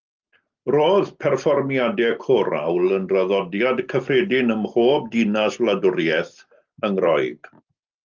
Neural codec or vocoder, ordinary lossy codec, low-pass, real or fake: none; Opus, 24 kbps; 7.2 kHz; real